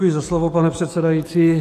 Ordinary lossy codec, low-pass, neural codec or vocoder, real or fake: AAC, 48 kbps; 14.4 kHz; autoencoder, 48 kHz, 128 numbers a frame, DAC-VAE, trained on Japanese speech; fake